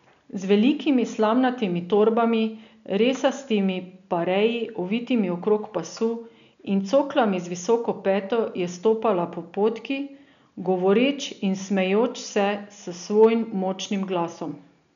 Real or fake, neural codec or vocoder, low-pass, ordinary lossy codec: real; none; 7.2 kHz; none